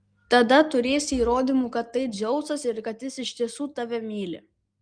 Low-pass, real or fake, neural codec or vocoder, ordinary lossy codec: 9.9 kHz; real; none; Opus, 32 kbps